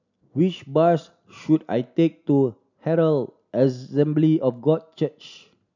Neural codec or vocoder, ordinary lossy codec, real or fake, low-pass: none; none; real; 7.2 kHz